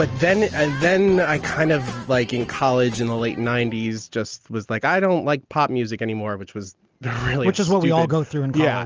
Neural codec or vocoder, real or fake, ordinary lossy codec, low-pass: none; real; Opus, 24 kbps; 7.2 kHz